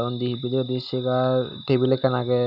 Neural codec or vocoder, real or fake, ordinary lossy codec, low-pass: none; real; none; 5.4 kHz